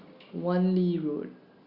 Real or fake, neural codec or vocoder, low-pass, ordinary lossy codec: real; none; 5.4 kHz; Opus, 64 kbps